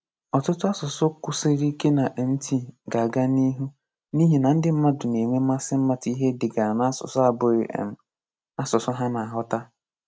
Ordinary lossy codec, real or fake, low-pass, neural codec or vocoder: none; real; none; none